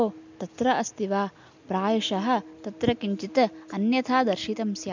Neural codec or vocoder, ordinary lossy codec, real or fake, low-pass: none; MP3, 64 kbps; real; 7.2 kHz